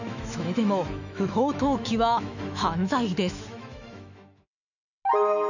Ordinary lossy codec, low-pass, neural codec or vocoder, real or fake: none; 7.2 kHz; autoencoder, 48 kHz, 128 numbers a frame, DAC-VAE, trained on Japanese speech; fake